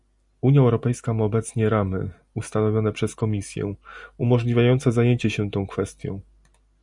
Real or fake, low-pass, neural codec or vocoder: real; 10.8 kHz; none